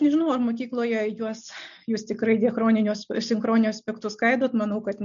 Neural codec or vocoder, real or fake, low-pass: none; real; 7.2 kHz